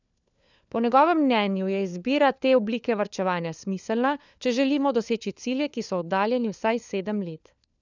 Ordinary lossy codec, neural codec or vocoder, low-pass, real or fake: none; codec, 16 kHz, 4 kbps, FunCodec, trained on LibriTTS, 50 frames a second; 7.2 kHz; fake